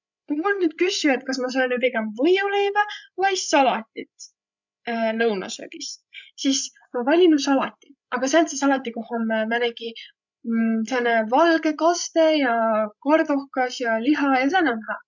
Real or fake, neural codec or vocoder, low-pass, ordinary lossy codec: fake; codec, 16 kHz, 16 kbps, FreqCodec, larger model; 7.2 kHz; none